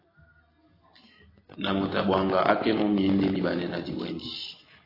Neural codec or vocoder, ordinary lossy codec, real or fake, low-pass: none; AAC, 24 kbps; real; 5.4 kHz